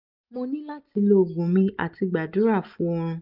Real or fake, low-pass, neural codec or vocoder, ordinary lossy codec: real; 5.4 kHz; none; none